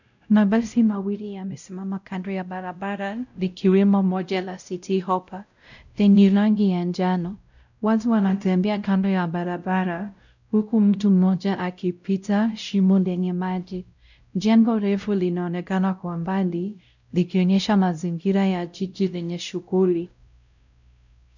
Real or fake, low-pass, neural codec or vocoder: fake; 7.2 kHz; codec, 16 kHz, 0.5 kbps, X-Codec, WavLM features, trained on Multilingual LibriSpeech